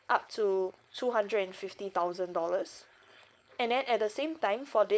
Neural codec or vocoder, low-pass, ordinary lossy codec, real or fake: codec, 16 kHz, 4.8 kbps, FACodec; none; none; fake